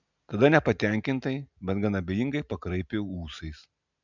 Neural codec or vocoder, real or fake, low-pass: none; real; 7.2 kHz